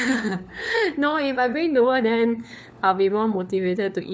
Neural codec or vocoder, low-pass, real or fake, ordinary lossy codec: codec, 16 kHz, 4 kbps, FunCodec, trained on LibriTTS, 50 frames a second; none; fake; none